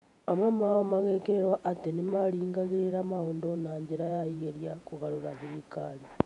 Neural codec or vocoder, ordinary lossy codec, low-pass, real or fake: vocoder, 48 kHz, 128 mel bands, Vocos; none; 10.8 kHz; fake